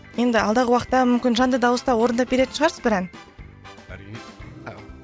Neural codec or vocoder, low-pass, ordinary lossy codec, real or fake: none; none; none; real